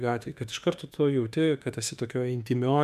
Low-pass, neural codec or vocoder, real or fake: 14.4 kHz; autoencoder, 48 kHz, 32 numbers a frame, DAC-VAE, trained on Japanese speech; fake